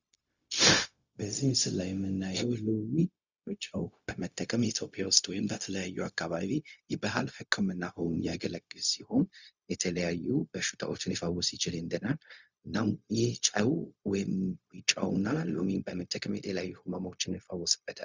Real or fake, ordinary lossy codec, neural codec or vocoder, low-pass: fake; Opus, 64 kbps; codec, 16 kHz, 0.4 kbps, LongCat-Audio-Codec; 7.2 kHz